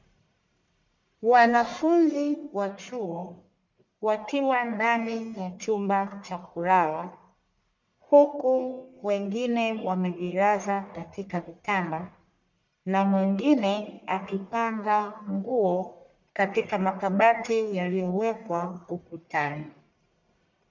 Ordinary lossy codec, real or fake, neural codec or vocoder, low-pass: MP3, 64 kbps; fake; codec, 44.1 kHz, 1.7 kbps, Pupu-Codec; 7.2 kHz